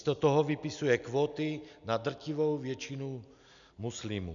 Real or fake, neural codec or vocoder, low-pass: real; none; 7.2 kHz